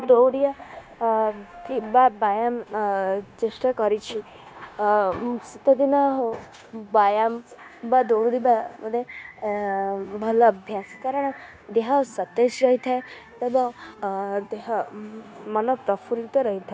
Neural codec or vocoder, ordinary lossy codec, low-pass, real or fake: codec, 16 kHz, 0.9 kbps, LongCat-Audio-Codec; none; none; fake